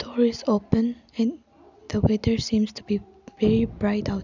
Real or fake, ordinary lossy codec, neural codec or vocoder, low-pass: real; none; none; 7.2 kHz